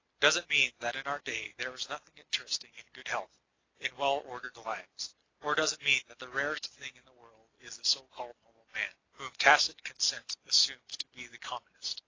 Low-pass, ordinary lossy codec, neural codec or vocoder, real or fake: 7.2 kHz; AAC, 32 kbps; none; real